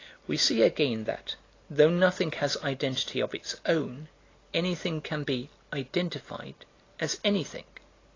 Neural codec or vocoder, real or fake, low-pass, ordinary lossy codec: none; real; 7.2 kHz; AAC, 32 kbps